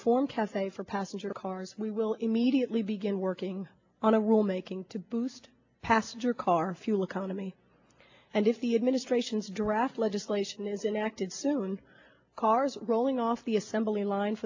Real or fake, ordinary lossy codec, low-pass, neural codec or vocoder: real; AAC, 48 kbps; 7.2 kHz; none